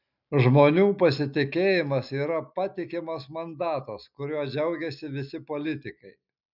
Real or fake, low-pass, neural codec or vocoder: real; 5.4 kHz; none